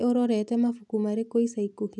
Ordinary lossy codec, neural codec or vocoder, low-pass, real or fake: none; none; 10.8 kHz; real